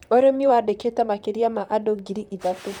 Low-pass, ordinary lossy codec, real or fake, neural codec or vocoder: 19.8 kHz; none; fake; vocoder, 44.1 kHz, 128 mel bands, Pupu-Vocoder